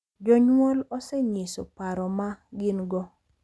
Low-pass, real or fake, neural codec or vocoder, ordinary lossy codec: none; real; none; none